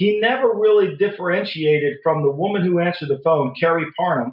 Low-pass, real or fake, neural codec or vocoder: 5.4 kHz; real; none